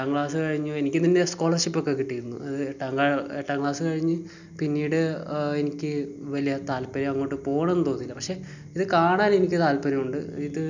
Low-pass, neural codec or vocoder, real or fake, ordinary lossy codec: 7.2 kHz; none; real; none